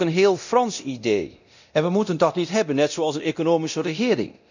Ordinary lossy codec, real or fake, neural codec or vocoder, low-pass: none; fake; codec, 24 kHz, 0.9 kbps, DualCodec; 7.2 kHz